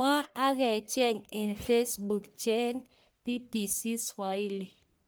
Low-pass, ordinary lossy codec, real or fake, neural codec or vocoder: none; none; fake; codec, 44.1 kHz, 1.7 kbps, Pupu-Codec